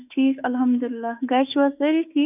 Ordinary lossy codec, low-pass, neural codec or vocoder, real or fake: AAC, 32 kbps; 3.6 kHz; autoencoder, 48 kHz, 32 numbers a frame, DAC-VAE, trained on Japanese speech; fake